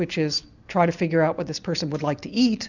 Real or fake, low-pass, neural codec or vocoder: real; 7.2 kHz; none